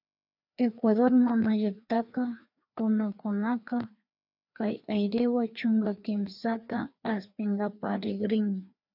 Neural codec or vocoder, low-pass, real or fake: codec, 16 kHz, 2 kbps, FreqCodec, larger model; 5.4 kHz; fake